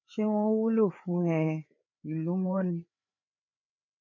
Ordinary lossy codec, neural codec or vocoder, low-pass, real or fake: none; codec, 16 kHz, 4 kbps, FreqCodec, larger model; 7.2 kHz; fake